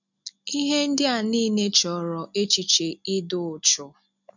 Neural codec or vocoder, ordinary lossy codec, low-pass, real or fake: none; none; 7.2 kHz; real